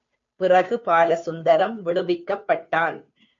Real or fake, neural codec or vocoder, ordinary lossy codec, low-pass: fake; codec, 16 kHz, 2 kbps, FunCodec, trained on Chinese and English, 25 frames a second; MP3, 48 kbps; 7.2 kHz